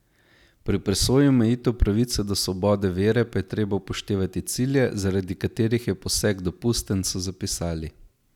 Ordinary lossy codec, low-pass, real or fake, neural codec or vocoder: none; 19.8 kHz; real; none